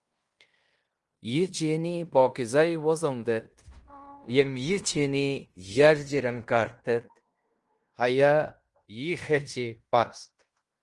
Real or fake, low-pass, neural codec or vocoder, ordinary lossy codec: fake; 10.8 kHz; codec, 16 kHz in and 24 kHz out, 0.9 kbps, LongCat-Audio-Codec, fine tuned four codebook decoder; Opus, 24 kbps